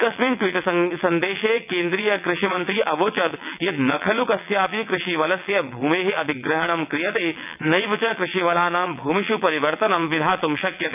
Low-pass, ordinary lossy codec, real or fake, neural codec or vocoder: 3.6 kHz; none; fake; vocoder, 22.05 kHz, 80 mel bands, WaveNeXt